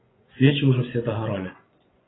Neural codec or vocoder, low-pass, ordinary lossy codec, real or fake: vocoder, 24 kHz, 100 mel bands, Vocos; 7.2 kHz; AAC, 16 kbps; fake